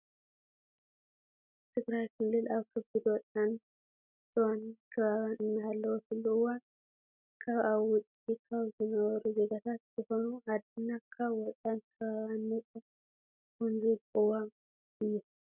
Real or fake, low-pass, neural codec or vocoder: real; 3.6 kHz; none